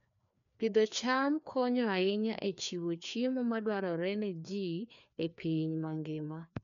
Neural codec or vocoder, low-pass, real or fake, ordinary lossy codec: codec, 16 kHz, 2 kbps, FreqCodec, larger model; 7.2 kHz; fake; none